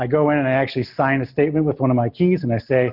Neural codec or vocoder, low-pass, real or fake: none; 5.4 kHz; real